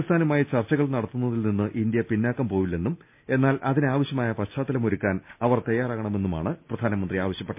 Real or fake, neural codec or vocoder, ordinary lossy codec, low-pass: real; none; MP3, 32 kbps; 3.6 kHz